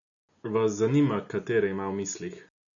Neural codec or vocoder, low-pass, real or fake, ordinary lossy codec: none; 7.2 kHz; real; none